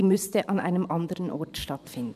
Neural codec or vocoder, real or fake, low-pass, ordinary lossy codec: vocoder, 44.1 kHz, 128 mel bands every 512 samples, BigVGAN v2; fake; 14.4 kHz; none